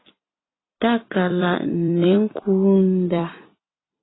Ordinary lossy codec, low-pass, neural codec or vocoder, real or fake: AAC, 16 kbps; 7.2 kHz; vocoder, 44.1 kHz, 128 mel bands every 256 samples, BigVGAN v2; fake